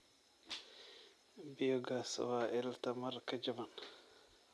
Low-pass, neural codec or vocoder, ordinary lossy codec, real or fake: none; none; none; real